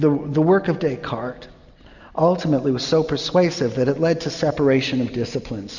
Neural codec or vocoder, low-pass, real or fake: none; 7.2 kHz; real